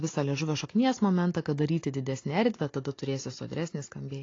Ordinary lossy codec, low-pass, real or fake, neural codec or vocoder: AAC, 32 kbps; 7.2 kHz; real; none